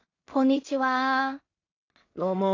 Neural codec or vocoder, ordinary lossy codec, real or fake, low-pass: codec, 16 kHz in and 24 kHz out, 0.4 kbps, LongCat-Audio-Codec, two codebook decoder; AAC, 32 kbps; fake; 7.2 kHz